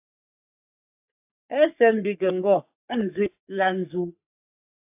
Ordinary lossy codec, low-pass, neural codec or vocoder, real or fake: AAC, 32 kbps; 3.6 kHz; codec, 44.1 kHz, 3.4 kbps, Pupu-Codec; fake